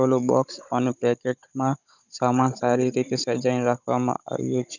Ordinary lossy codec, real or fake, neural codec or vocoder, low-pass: none; fake; codec, 16 kHz, 16 kbps, FunCodec, trained on Chinese and English, 50 frames a second; 7.2 kHz